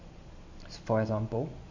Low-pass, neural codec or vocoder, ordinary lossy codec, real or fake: 7.2 kHz; none; AAC, 48 kbps; real